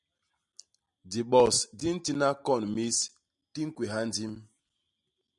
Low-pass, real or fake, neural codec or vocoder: 10.8 kHz; real; none